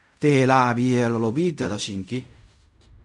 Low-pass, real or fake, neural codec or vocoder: 10.8 kHz; fake; codec, 16 kHz in and 24 kHz out, 0.4 kbps, LongCat-Audio-Codec, fine tuned four codebook decoder